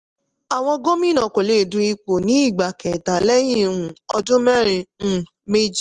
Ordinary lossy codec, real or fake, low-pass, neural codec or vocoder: Opus, 24 kbps; real; 10.8 kHz; none